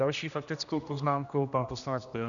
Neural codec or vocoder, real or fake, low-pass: codec, 16 kHz, 1 kbps, X-Codec, HuBERT features, trained on general audio; fake; 7.2 kHz